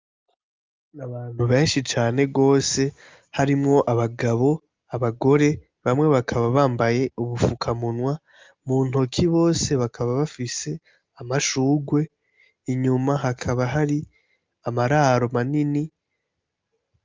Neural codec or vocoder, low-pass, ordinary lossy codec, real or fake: none; 7.2 kHz; Opus, 24 kbps; real